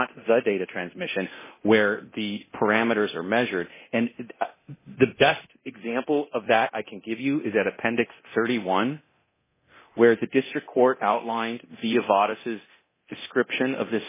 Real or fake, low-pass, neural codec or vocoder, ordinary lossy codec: fake; 3.6 kHz; codec, 24 kHz, 0.9 kbps, DualCodec; MP3, 16 kbps